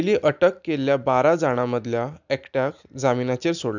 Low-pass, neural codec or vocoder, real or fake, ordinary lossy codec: 7.2 kHz; none; real; none